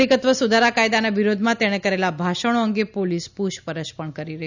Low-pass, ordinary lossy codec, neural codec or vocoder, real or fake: 7.2 kHz; none; none; real